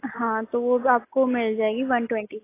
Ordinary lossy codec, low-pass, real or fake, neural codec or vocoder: AAC, 24 kbps; 3.6 kHz; real; none